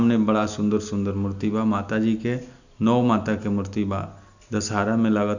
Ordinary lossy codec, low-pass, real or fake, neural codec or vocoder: none; 7.2 kHz; real; none